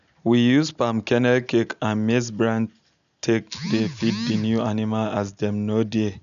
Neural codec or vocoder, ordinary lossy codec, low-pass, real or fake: none; none; 7.2 kHz; real